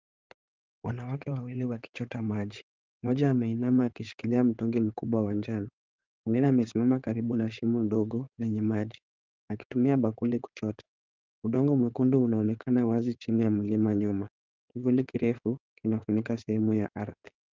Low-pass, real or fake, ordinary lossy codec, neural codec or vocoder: 7.2 kHz; fake; Opus, 32 kbps; codec, 16 kHz in and 24 kHz out, 2.2 kbps, FireRedTTS-2 codec